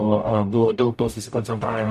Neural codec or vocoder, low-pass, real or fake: codec, 44.1 kHz, 0.9 kbps, DAC; 14.4 kHz; fake